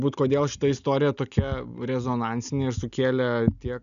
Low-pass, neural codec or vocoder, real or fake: 7.2 kHz; none; real